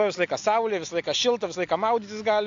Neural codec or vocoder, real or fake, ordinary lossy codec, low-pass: none; real; AAC, 64 kbps; 7.2 kHz